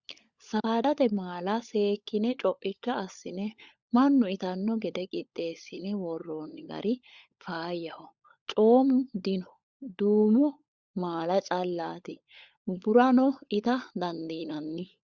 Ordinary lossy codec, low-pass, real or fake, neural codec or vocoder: Opus, 64 kbps; 7.2 kHz; fake; codec, 16 kHz, 16 kbps, FunCodec, trained on LibriTTS, 50 frames a second